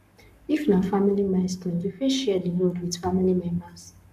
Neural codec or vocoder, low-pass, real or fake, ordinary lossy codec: codec, 44.1 kHz, 7.8 kbps, Pupu-Codec; 14.4 kHz; fake; none